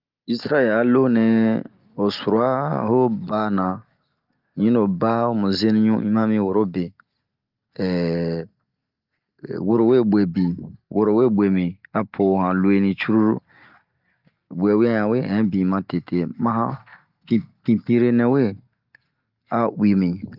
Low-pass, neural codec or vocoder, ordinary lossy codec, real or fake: 5.4 kHz; none; Opus, 32 kbps; real